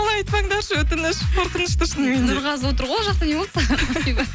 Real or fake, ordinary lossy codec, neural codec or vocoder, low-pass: real; none; none; none